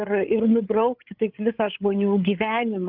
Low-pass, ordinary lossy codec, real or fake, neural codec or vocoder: 5.4 kHz; Opus, 24 kbps; fake; codec, 16 kHz, 8 kbps, FreqCodec, larger model